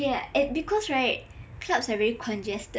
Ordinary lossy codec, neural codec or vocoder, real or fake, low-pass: none; none; real; none